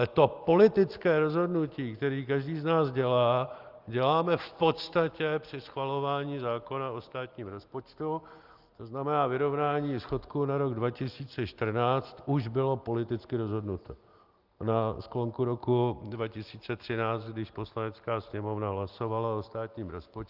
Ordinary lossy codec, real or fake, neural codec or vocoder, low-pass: Opus, 32 kbps; real; none; 5.4 kHz